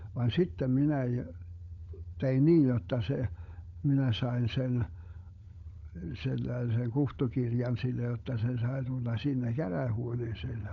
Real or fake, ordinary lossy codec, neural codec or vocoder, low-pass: fake; none; codec, 16 kHz, 16 kbps, FunCodec, trained on LibriTTS, 50 frames a second; 7.2 kHz